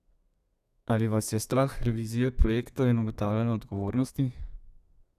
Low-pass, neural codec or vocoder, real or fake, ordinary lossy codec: 14.4 kHz; codec, 32 kHz, 1.9 kbps, SNAC; fake; none